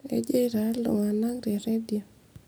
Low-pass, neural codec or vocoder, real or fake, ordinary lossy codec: none; none; real; none